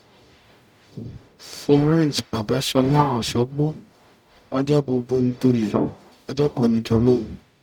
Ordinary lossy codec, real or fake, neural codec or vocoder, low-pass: none; fake; codec, 44.1 kHz, 0.9 kbps, DAC; 19.8 kHz